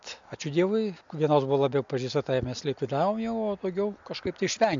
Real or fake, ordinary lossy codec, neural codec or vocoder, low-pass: real; MP3, 64 kbps; none; 7.2 kHz